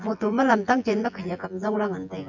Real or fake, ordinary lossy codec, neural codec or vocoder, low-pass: fake; none; vocoder, 24 kHz, 100 mel bands, Vocos; 7.2 kHz